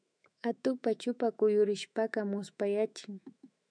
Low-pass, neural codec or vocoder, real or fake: 9.9 kHz; autoencoder, 48 kHz, 128 numbers a frame, DAC-VAE, trained on Japanese speech; fake